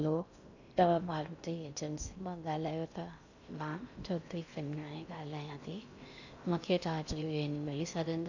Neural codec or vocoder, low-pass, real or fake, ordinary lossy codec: codec, 16 kHz in and 24 kHz out, 0.6 kbps, FocalCodec, streaming, 2048 codes; 7.2 kHz; fake; none